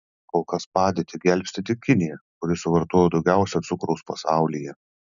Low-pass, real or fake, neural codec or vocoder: 7.2 kHz; real; none